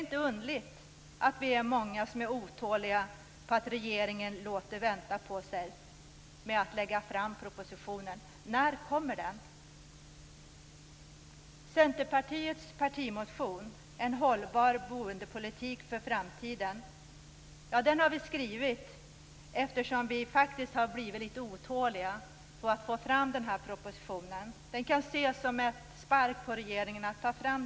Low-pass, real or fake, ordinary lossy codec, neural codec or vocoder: none; real; none; none